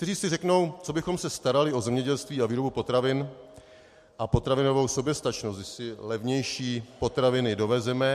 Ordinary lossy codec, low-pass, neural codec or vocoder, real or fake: MP3, 64 kbps; 14.4 kHz; none; real